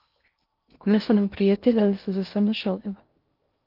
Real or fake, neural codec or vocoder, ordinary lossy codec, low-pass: fake; codec, 16 kHz in and 24 kHz out, 0.6 kbps, FocalCodec, streaming, 2048 codes; Opus, 24 kbps; 5.4 kHz